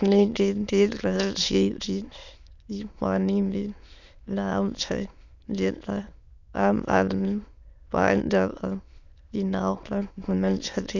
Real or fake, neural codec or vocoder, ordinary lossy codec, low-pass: fake; autoencoder, 22.05 kHz, a latent of 192 numbers a frame, VITS, trained on many speakers; none; 7.2 kHz